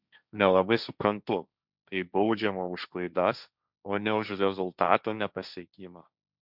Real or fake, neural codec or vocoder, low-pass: fake; codec, 16 kHz, 1.1 kbps, Voila-Tokenizer; 5.4 kHz